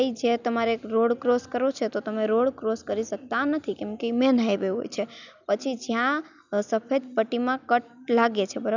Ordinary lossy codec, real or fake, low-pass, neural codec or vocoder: none; real; 7.2 kHz; none